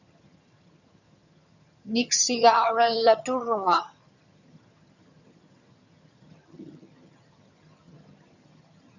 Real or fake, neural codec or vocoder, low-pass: fake; vocoder, 22.05 kHz, 80 mel bands, HiFi-GAN; 7.2 kHz